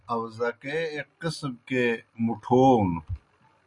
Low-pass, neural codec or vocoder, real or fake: 10.8 kHz; none; real